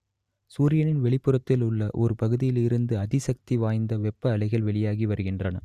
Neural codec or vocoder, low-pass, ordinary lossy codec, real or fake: none; 14.4 kHz; Opus, 64 kbps; real